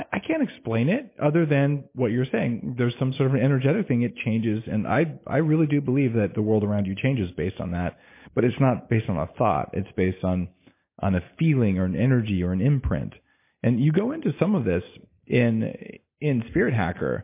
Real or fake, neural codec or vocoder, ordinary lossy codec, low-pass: real; none; MP3, 24 kbps; 3.6 kHz